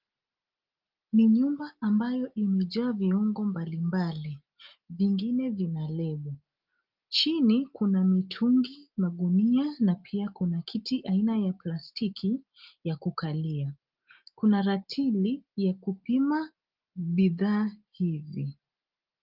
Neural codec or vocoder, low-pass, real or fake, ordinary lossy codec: none; 5.4 kHz; real; Opus, 32 kbps